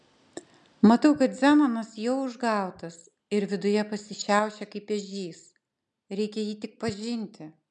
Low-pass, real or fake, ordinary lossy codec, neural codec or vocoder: 10.8 kHz; real; MP3, 96 kbps; none